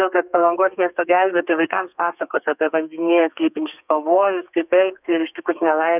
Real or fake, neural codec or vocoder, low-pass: fake; codec, 32 kHz, 1.9 kbps, SNAC; 3.6 kHz